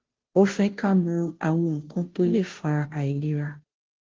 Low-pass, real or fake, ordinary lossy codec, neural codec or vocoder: 7.2 kHz; fake; Opus, 16 kbps; codec, 16 kHz, 0.5 kbps, FunCodec, trained on Chinese and English, 25 frames a second